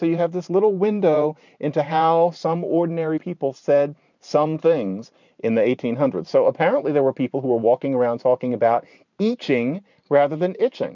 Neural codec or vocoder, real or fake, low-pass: vocoder, 44.1 kHz, 128 mel bands every 512 samples, BigVGAN v2; fake; 7.2 kHz